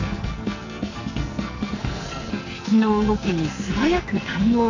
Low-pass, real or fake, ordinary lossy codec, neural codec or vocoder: 7.2 kHz; fake; AAC, 48 kbps; codec, 44.1 kHz, 2.6 kbps, SNAC